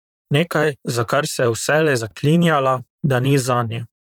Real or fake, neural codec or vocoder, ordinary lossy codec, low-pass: fake; vocoder, 44.1 kHz, 128 mel bands, Pupu-Vocoder; none; 19.8 kHz